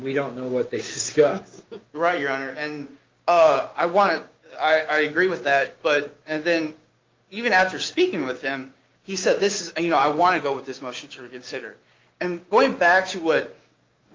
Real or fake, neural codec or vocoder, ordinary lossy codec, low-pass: fake; codec, 16 kHz, 6 kbps, DAC; Opus, 24 kbps; 7.2 kHz